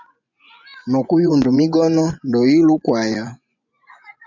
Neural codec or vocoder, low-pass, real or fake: vocoder, 24 kHz, 100 mel bands, Vocos; 7.2 kHz; fake